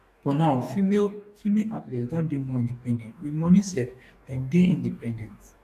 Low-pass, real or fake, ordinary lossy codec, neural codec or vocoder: 14.4 kHz; fake; none; codec, 44.1 kHz, 2.6 kbps, DAC